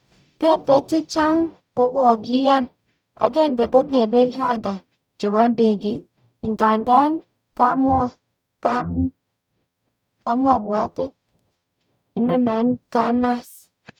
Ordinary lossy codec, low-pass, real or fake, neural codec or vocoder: none; 19.8 kHz; fake; codec, 44.1 kHz, 0.9 kbps, DAC